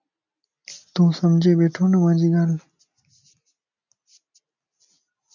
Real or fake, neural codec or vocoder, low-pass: real; none; 7.2 kHz